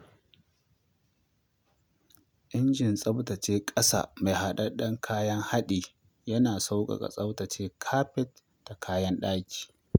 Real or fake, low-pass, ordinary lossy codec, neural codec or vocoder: real; none; none; none